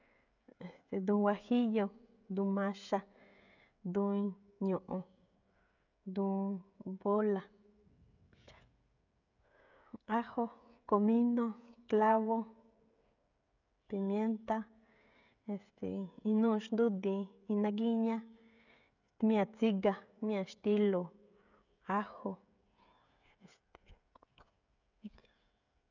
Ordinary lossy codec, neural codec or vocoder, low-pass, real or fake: none; codec, 16 kHz, 16 kbps, FreqCodec, smaller model; 7.2 kHz; fake